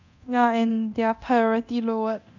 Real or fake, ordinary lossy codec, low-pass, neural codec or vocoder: fake; none; 7.2 kHz; codec, 24 kHz, 0.9 kbps, DualCodec